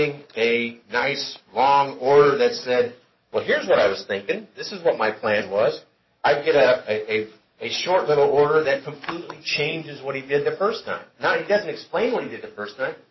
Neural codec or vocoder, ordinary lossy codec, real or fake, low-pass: codec, 44.1 kHz, 7.8 kbps, DAC; MP3, 24 kbps; fake; 7.2 kHz